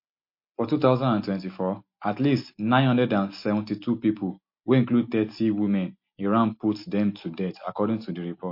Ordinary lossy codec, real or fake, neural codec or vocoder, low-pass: MP3, 32 kbps; real; none; 5.4 kHz